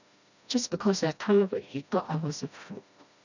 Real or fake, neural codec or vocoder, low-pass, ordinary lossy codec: fake; codec, 16 kHz, 1 kbps, FreqCodec, smaller model; 7.2 kHz; none